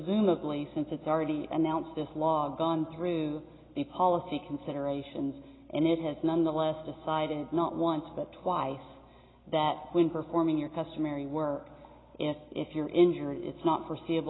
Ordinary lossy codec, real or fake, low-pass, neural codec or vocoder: AAC, 16 kbps; real; 7.2 kHz; none